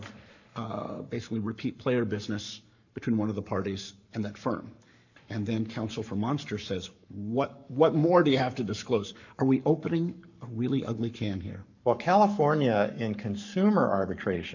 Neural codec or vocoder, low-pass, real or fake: codec, 44.1 kHz, 7.8 kbps, Pupu-Codec; 7.2 kHz; fake